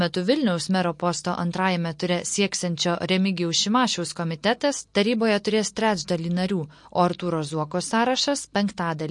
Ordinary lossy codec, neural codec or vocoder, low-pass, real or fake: MP3, 48 kbps; none; 10.8 kHz; real